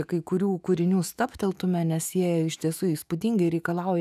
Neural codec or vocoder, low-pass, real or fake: autoencoder, 48 kHz, 128 numbers a frame, DAC-VAE, trained on Japanese speech; 14.4 kHz; fake